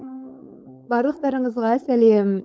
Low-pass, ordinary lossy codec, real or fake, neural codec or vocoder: none; none; fake; codec, 16 kHz, 4.8 kbps, FACodec